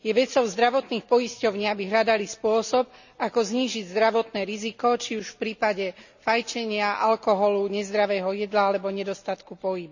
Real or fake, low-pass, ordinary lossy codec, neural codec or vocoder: real; 7.2 kHz; none; none